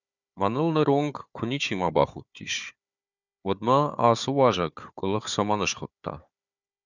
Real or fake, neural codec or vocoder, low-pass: fake; codec, 16 kHz, 4 kbps, FunCodec, trained on Chinese and English, 50 frames a second; 7.2 kHz